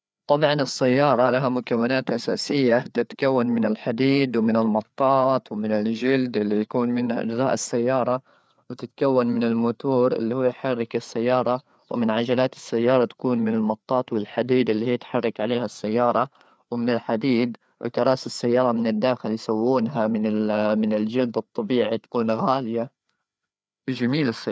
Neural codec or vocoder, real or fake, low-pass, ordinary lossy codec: codec, 16 kHz, 4 kbps, FreqCodec, larger model; fake; none; none